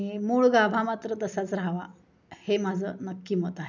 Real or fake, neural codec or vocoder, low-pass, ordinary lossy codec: real; none; 7.2 kHz; none